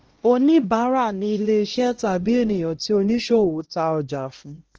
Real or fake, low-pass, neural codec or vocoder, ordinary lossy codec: fake; 7.2 kHz; codec, 16 kHz, 1 kbps, X-Codec, HuBERT features, trained on LibriSpeech; Opus, 16 kbps